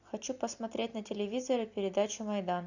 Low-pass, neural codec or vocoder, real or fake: 7.2 kHz; none; real